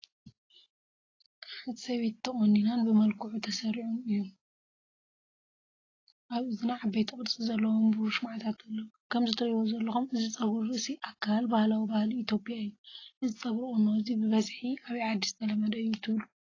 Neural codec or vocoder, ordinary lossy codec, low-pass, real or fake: none; AAC, 32 kbps; 7.2 kHz; real